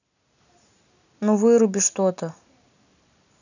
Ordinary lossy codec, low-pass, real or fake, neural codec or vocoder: none; 7.2 kHz; real; none